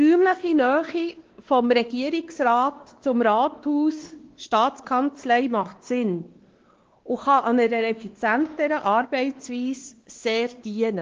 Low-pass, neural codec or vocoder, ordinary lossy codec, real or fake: 7.2 kHz; codec, 16 kHz, 2 kbps, X-Codec, WavLM features, trained on Multilingual LibriSpeech; Opus, 16 kbps; fake